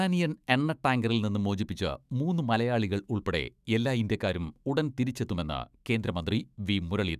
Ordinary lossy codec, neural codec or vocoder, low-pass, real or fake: none; autoencoder, 48 kHz, 128 numbers a frame, DAC-VAE, trained on Japanese speech; 14.4 kHz; fake